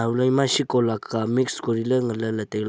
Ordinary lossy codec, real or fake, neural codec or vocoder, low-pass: none; real; none; none